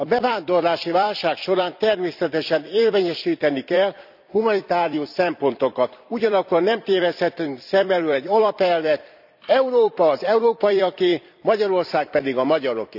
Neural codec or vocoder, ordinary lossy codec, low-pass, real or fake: vocoder, 44.1 kHz, 128 mel bands every 256 samples, BigVGAN v2; none; 5.4 kHz; fake